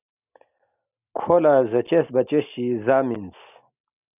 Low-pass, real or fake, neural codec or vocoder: 3.6 kHz; real; none